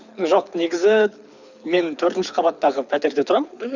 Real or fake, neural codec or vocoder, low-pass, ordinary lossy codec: fake; codec, 24 kHz, 6 kbps, HILCodec; 7.2 kHz; none